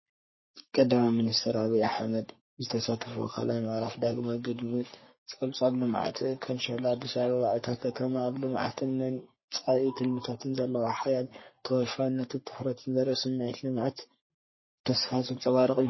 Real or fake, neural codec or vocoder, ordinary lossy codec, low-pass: fake; codec, 44.1 kHz, 3.4 kbps, Pupu-Codec; MP3, 24 kbps; 7.2 kHz